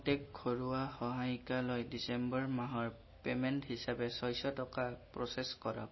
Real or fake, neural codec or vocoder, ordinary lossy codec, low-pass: real; none; MP3, 24 kbps; 7.2 kHz